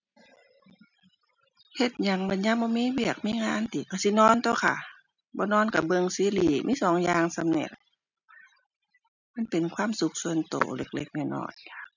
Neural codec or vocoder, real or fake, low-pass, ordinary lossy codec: none; real; 7.2 kHz; none